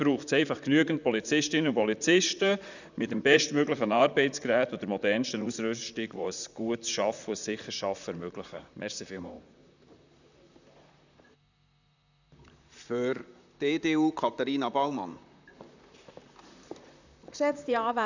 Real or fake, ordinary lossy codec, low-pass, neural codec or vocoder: fake; none; 7.2 kHz; vocoder, 44.1 kHz, 128 mel bands, Pupu-Vocoder